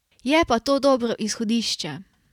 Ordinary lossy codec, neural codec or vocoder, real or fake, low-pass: none; vocoder, 44.1 kHz, 128 mel bands every 512 samples, BigVGAN v2; fake; 19.8 kHz